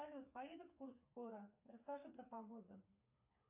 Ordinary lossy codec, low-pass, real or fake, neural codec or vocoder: AAC, 32 kbps; 3.6 kHz; fake; codec, 16 kHz, 4 kbps, FreqCodec, smaller model